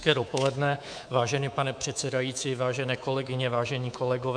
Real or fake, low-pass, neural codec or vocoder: fake; 9.9 kHz; codec, 24 kHz, 3.1 kbps, DualCodec